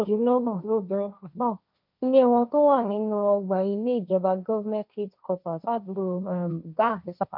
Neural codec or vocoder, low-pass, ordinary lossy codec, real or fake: codec, 16 kHz, 1.1 kbps, Voila-Tokenizer; 5.4 kHz; none; fake